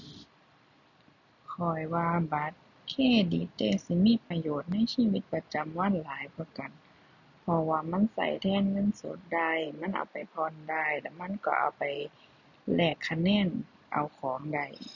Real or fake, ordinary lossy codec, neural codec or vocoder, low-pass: real; none; none; 7.2 kHz